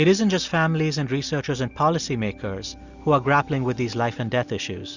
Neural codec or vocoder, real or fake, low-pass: none; real; 7.2 kHz